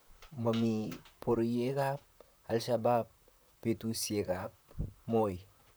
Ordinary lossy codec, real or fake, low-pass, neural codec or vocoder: none; fake; none; vocoder, 44.1 kHz, 128 mel bands, Pupu-Vocoder